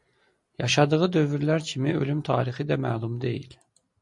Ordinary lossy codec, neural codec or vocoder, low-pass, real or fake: MP3, 48 kbps; none; 10.8 kHz; real